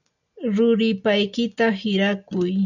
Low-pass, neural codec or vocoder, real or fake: 7.2 kHz; none; real